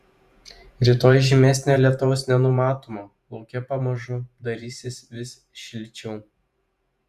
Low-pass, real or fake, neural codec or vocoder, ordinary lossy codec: 14.4 kHz; fake; vocoder, 48 kHz, 128 mel bands, Vocos; Opus, 64 kbps